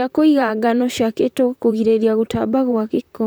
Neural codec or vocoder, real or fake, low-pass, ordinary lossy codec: vocoder, 44.1 kHz, 128 mel bands, Pupu-Vocoder; fake; none; none